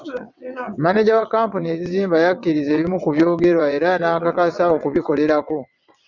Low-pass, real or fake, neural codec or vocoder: 7.2 kHz; fake; vocoder, 22.05 kHz, 80 mel bands, WaveNeXt